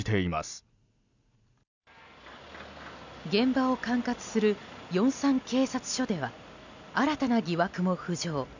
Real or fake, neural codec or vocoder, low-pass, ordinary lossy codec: real; none; 7.2 kHz; none